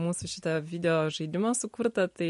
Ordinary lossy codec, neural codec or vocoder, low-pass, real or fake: MP3, 48 kbps; none; 14.4 kHz; real